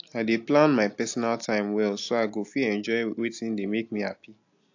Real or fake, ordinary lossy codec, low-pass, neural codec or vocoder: real; AAC, 48 kbps; 7.2 kHz; none